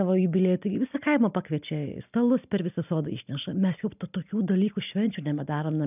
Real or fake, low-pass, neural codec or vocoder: real; 3.6 kHz; none